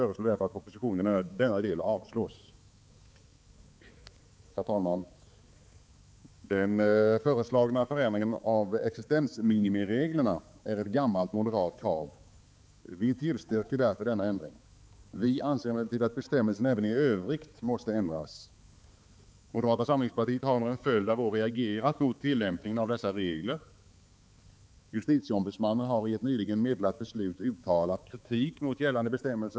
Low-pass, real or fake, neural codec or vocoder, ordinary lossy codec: none; fake; codec, 16 kHz, 4 kbps, X-Codec, HuBERT features, trained on balanced general audio; none